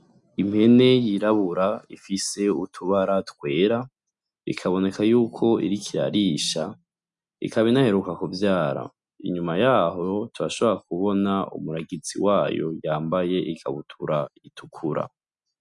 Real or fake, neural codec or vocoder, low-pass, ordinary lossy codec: real; none; 10.8 kHz; MP3, 96 kbps